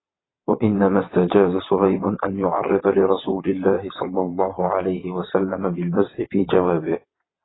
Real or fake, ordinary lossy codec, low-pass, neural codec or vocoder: fake; AAC, 16 kbps; 7.2 kHz; vocoder, 22.05 kHz, 80 mel bands, WaveNeXt